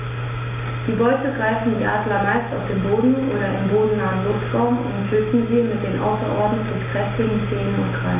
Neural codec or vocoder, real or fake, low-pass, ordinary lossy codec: none; real; 3.6 kHz; MP3, 24 kbps